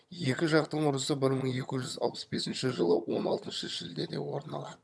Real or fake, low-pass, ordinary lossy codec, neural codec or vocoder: fake; none; none; vocoder, 22.05 kHz, 80 mel bands, HiFi-GAN